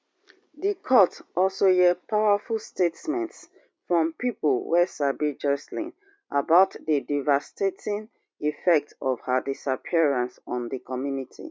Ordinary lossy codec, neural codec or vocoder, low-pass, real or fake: none; none; none; real